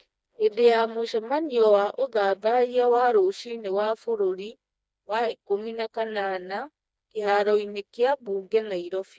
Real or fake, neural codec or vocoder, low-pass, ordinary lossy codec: fake; codec, 16 kHz, 2 kbps, FreqCodec, smaller model; none; none